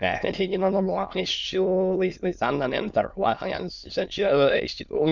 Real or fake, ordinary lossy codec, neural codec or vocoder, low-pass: fake; Opus, 64 kbps; autoencoder, 22.05 kHz, a latent of 192 numbers a frame, VITS, trained on many speakers; 7.2 kHz